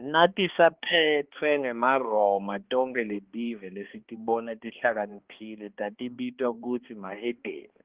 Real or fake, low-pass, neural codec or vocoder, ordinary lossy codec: fake; 3.6 kHz; codec, 16 kHz, 2 kbps, X-Codec, HuBERT features, trained on balanced general audio; Opus, 16 kbps